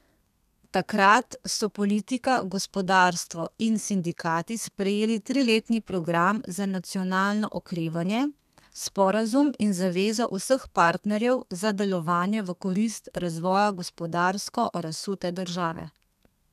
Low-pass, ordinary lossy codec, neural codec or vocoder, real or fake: 14.4 kHz; none; codec, 32 kHz, 1.9 kbps, SNAC; fake